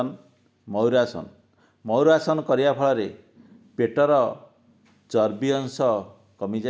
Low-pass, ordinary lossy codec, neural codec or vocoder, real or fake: none; none; none; real